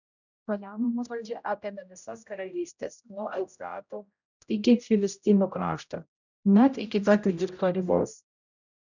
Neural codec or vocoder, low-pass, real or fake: codec, 16 kHz, 0.5 kbps, X-Codec, HuBERT features, trained on general audio; 7.2 kHz; fake